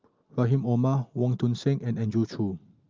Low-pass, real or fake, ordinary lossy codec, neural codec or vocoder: 7.2 kHz; real; Opus, 24 kbps; none